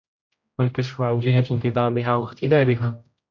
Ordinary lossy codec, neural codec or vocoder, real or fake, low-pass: MP3, 48 kbps; codec, 16 kHz, 0.5 kbps, X-Codec, HuBERT features, trained on general audio; fake; 7.2 kHz